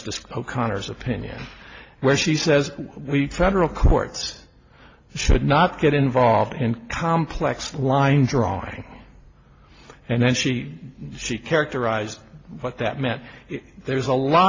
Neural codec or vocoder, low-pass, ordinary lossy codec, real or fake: none; 7.2 kHz; AAC, 32 kbps; real